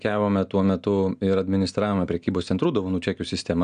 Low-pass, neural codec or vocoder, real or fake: 9.9 kHz; none; real